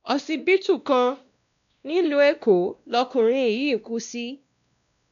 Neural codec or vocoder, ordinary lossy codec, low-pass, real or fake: codec, 16 kHz, 1 kbps, X-Codec, WavLM features, trained on Multilingual LibriSpeech; none; 7.2 kHz; fake